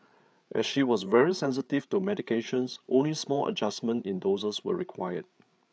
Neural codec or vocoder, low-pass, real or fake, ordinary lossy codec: codec, 16 kHz, 8 kbps, FreqCodec, larger model; none; fake; none